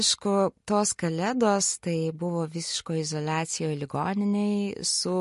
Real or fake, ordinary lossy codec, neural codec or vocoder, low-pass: real; MP3, 48 kbps; none; 14.4 kHz